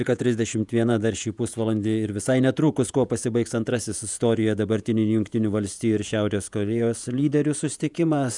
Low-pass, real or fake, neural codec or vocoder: 10.8 kHz; fake; vocoder, 48 kHz, 128 mel bands, Vocos